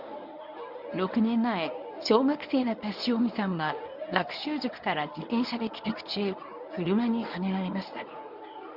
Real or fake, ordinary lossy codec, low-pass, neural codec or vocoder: fake; none; 5.4 kHz; codec, 24 kHz, 0.9 kbps, WavTokenizer, medium speech release version 1